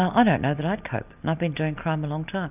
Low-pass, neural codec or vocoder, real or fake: 3.6 kHz; none; real